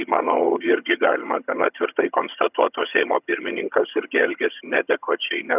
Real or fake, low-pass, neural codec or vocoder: fake; 3.6 kHz; vocoder, 22.05 kHz, 80 mel bands, HiFi-GAN